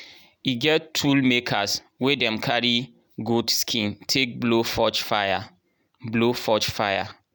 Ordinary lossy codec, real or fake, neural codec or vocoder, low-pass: none; real; none; none